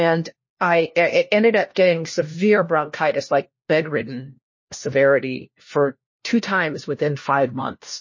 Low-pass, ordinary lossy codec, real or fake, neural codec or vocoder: 7.2 kHz; MP3, 32 kbps; fake; codec, 16 kHz, 1 kbps, FunCodec, trained on LibriTTS, 50 frames a second